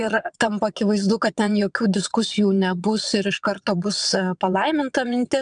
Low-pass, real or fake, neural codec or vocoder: 9.9 kHz; fake; vocoder, 22.05 kHz, 80 mel bands, WaveNeXt